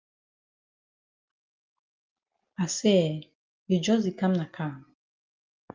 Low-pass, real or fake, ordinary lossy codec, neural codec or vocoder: 7.2 kHz; real; Opus, 32 kbps; none